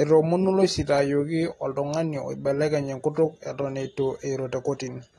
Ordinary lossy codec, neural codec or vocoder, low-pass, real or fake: AAC, 32 kbps; none; 19.8 kHz; real